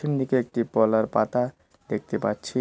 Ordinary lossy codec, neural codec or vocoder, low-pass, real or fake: none; none; none; real